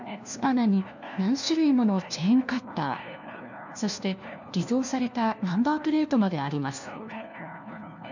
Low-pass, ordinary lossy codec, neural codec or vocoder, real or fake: 7.2 kHz; none; codec, 16 kHz, 1 kbps, FunCodec, trained on LibriTTS, 50 frames a second; fake